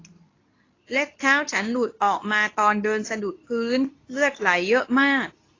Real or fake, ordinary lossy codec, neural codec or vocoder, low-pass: fake; AAC, 32 kbps; codec, 24 kHz, 0.9 kbps, WavTokenizer, medium speech release version 2; 7.2 kHz